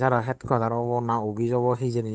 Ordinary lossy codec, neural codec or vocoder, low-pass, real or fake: none; codec, 16 kHz, 8 kbps, FunCodec, trained on Chinese and English, 25 frames a second; none; fake